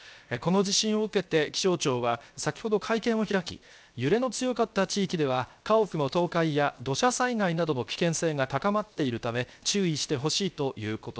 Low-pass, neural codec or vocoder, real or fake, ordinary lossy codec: none; codec, 16 kHz, 0.7 kbps, FocalCodec; fake; none